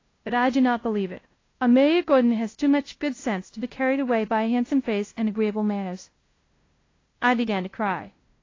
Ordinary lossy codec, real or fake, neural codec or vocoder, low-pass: AAC, 32 kbps; fake; codec, 16 kHz, 0.5 kbps, FunCodec, trained on LibriTTS, 25 frames a second; 7.2 kHz